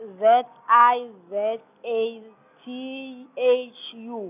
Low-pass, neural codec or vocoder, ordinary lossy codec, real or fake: 3.6 kHz; none; none; real